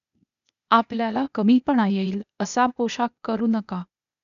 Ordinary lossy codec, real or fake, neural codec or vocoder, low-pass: none; fake; codec, 16 kHz, 0.8 kbps, ZipCodec; 7.2 kHz